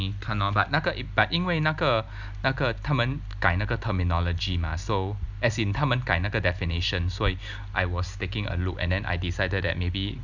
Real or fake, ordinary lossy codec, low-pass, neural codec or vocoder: real; none; 7.2 kHz; none